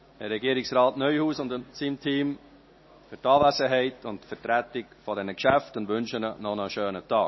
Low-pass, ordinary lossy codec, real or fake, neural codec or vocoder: 7.2 kHz; MP3, 24 kbps; real; none